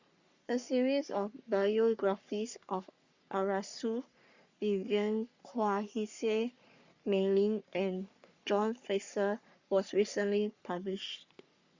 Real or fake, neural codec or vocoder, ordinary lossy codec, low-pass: fake; codec, 44.1 kHz, 3.4 kbps, Pupu-Codec; Opus, 64 kbps; 7.2 kHz